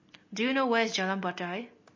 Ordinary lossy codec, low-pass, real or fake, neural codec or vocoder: MP3, 32 kbps; 7.2 kHz; real; none